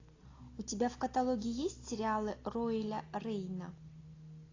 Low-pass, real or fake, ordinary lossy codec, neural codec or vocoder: 7.2 kHz; real; AAC, 32 kbps; none